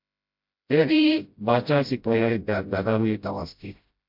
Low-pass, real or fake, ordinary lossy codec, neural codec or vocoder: 5.4 kHz; fake; MP3, 48 kbps; codec, 16 kHz, 0.5 kbps, FreqCodec, smaller model